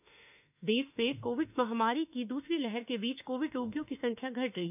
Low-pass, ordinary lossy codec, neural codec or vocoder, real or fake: 3.6 kHz; none; autoencoder, 48 kHz, 32 numbers a frame, DAC-VAE, trained on Japanese speech; fake